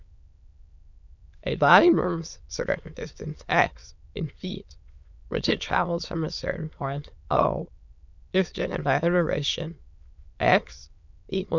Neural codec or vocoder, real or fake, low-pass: autoencoder, 22.05 kHz, a latent of 192 numbers a frame, VITS, trained on many speakers; fake; 7.2 kHz